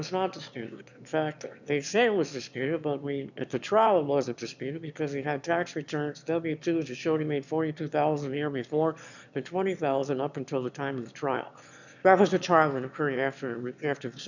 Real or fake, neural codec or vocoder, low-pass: fake; autoencoder, 22.05 kHz, a latent of 192 numbers a frame, VITS, trained on one speaker; 7.2 kHz